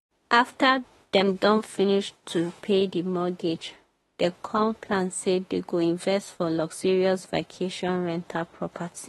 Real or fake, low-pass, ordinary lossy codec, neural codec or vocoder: fake; 19.8 kHz; AAC, 32 kbps; autoencoder, 48 kHz, 32 numbers a frame, DAC-VAE, trained on Japanese speech